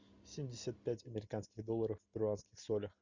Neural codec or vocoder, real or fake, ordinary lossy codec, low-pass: none; real; Opus, 64 kbps; 7.2 kHz